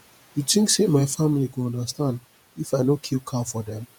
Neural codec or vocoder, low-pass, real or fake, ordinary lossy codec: vocoder, 48 kHz, 128 mel bands, Vocos; none; fake; none